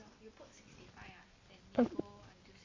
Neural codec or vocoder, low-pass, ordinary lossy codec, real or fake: none; 7.2 kHz; MP3, 32 kbps; real